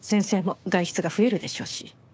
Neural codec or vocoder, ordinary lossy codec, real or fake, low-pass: codec, 16 kHz, 6 kbps, DAC; none; fake; none